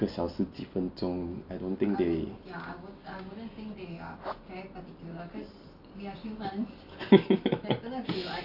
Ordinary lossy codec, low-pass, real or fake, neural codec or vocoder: none; 5.4 kHz; real; none